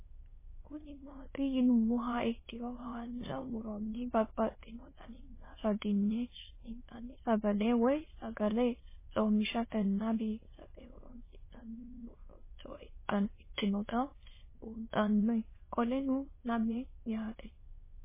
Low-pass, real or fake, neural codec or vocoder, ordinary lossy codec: 3.6 kHz; fake; autoencoder, 22.05 kHz, a latent of 192 numbers a frame, VITS, trained on many speakers; MP3, 16 kbps